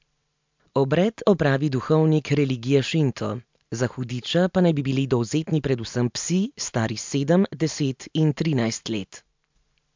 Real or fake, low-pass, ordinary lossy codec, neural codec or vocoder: real; 7.2 kHz; MP3, 64 kbps; none